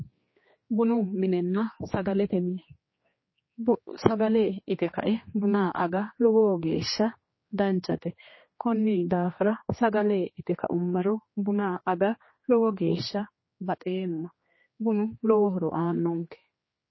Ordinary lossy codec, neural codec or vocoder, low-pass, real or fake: MP3, 24 kbps; codec, 16 kHz, 2 kbps, X-Codec, HuBERT features, trained on general audio; 7.2 kHz; fake